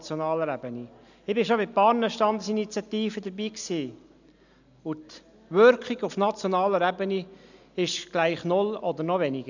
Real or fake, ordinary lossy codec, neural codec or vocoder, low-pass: real; none; none; 7.2 kHz